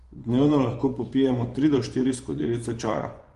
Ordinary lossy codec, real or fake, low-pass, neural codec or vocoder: Opus, 24 kbps; fake; 10.8 kHz; vocoder, 24 kHz, 100 mel bands, Vocos